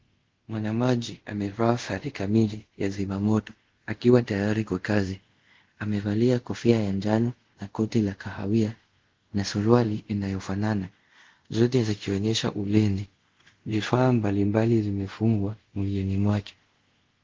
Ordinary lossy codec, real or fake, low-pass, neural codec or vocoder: Opus, 16 kbps; fake; 7.2 kHz; codec, 24 kHz, 0.5 kbps, DualCodec